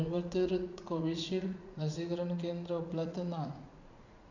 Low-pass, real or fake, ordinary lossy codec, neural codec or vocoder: 7.2 kHz; fake; none; vocoder, 44.1 kHz, 80 mel bands, Vocos